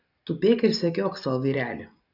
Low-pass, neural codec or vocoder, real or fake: 5.4 kHz; none; real